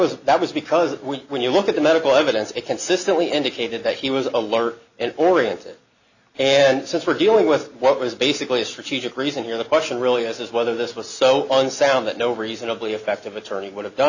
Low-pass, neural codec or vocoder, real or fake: 7.2 kHz; none; real